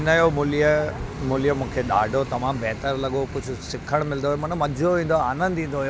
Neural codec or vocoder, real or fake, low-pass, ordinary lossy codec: none; real; none; none